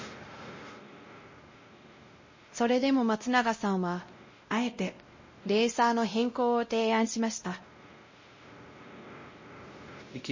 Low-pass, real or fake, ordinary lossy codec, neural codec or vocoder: 7.2 kHz; fake; MP3, 32 kbps; codec, 16 kHz, 0.5 kbps, X-Codec, WavLM features, trained on Multilingual LibriSpeech